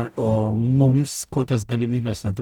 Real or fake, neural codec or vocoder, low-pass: fake; codec, 44.1 kHz, 0.9 kbps, DAC; 19.8 kHz